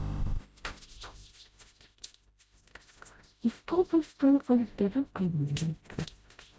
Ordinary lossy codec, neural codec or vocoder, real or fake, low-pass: none; codec, 16 kHz, 0.5 kbps, FreqCodec, smaller model; fake; none